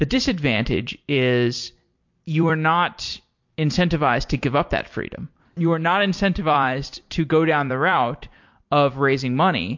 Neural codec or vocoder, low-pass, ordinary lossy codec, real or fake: vocoder, 44.1 kHz, 128 mel bands every 256 samples, BigVGAN v2; 7.2 kHz; MP3, 48 kbps; fake